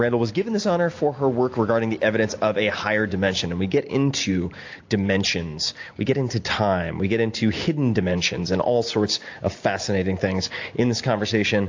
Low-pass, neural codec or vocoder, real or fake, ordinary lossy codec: 7.2 kHz; none; real; AAC, 48 kbps